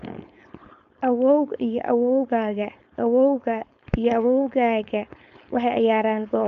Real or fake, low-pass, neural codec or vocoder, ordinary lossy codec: fake; 7.2 kHz; codec, 16 kHz, 4.8 kbps, FACodec; MP3, 64 kbps